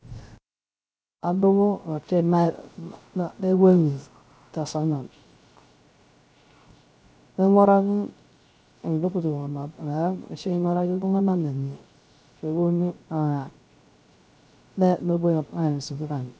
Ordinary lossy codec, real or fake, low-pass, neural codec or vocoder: none; fake; none; codec, 16 kHz, 0.3 kbps, FocalCodec